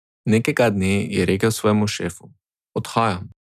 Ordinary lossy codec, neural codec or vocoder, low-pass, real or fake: none; none; 14.4 kHz; real